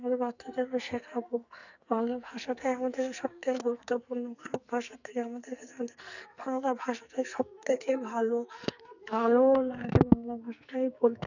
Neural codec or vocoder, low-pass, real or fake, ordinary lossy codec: codec, 44.1 kHz, 2.6 kbps, SNAC; 7.2 kHz; fake; none